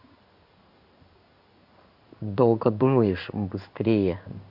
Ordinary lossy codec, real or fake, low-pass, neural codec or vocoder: none; fake; 5.4 kHz; codec, 16 kHz in and 24 kHz out, 1 kbps, XY-Tokenizer